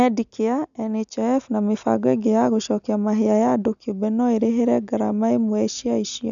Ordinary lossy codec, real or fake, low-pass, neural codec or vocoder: none; real; 7.2 kHz; none